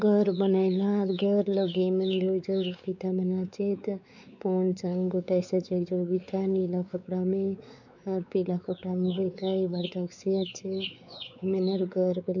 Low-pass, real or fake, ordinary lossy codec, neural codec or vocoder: 7.2 kHz; fake; none; codec, 16 kHz, 4 kbps, X-Codec, WavLM features, trained on Multilingual LibriSpeech